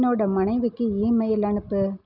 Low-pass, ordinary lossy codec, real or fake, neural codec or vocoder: 5.4 kHz; none; real; none